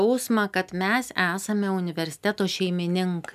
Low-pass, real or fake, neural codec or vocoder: 14.4 kHz; real; none